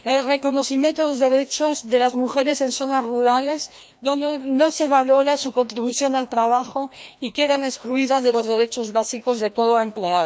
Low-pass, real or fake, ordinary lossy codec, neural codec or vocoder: none; fake; none; codec, 16 kHz, 1 kbps, FreqCodec, larger model